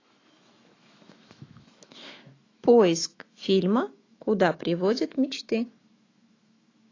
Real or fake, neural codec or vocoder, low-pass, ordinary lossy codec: fake; autoencoder, 48 kHz, 128 numbers a frame, DAC-VAE, trained on Japanese speech; 7.2 kHz; AAC, 32 kbps